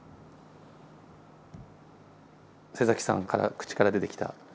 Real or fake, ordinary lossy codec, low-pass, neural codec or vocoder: real; none; none; none